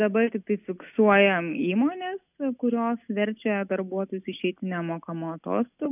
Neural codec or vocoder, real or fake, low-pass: none; real; 3.6 kHz